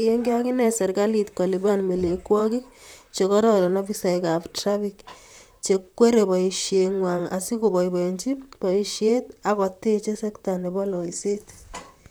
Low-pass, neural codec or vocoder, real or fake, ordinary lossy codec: none; vocoder, 44.1 kHz, 128 mel bands, Pupu-Vocoder; fake; none